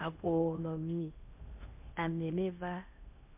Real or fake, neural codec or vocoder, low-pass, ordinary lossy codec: fake; codec, 16 kHz in and 24 kHz out, 0.6 kbps, FocalCodec, streaming, 4096 codes; 3.6 kHz; MP3, 32 kbps